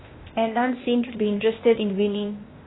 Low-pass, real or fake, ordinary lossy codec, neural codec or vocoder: 7.2 kHz; fake; AAC, 16 kbps; codec, 16 kHz, 0.8 kbps, ZipCodec